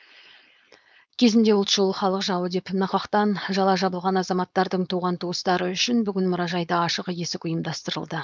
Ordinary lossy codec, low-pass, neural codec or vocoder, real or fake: none; none; codec, 16 kHz, 4.8 kbps, FACodec; fake